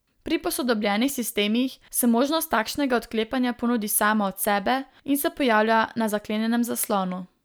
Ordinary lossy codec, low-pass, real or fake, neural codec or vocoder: none; none; real; none